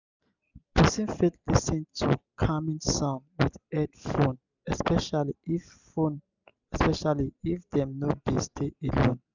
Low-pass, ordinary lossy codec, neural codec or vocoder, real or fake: 7.2 kHz; none; none; real